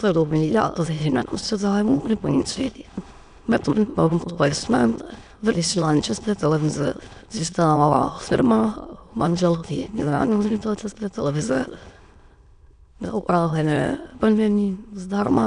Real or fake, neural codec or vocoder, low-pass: fake; autoencoder, 22.05 kHz, a latent of 192 numbers a frame, VITS, trained on many speakers; 9.9 kHz